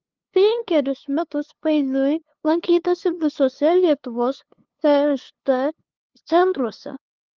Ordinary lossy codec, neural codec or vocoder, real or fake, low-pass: Opus, 32 kbps; codec, 16 kHz, 2 kbps, FunCodec, trained on LibriTTS, 25 frames a second; fake; 7.2 kHz